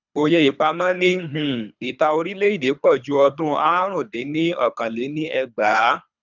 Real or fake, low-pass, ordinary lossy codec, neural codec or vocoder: fake; 7.2 kHz; none; codec, 24 kHz, 3 kbps, HILCodec